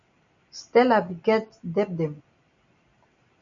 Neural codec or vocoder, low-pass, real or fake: none; 7.2 kHz; real